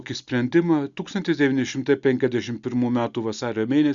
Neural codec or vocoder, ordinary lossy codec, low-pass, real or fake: none; Opus, 64 kbps; 7.2 kHz; real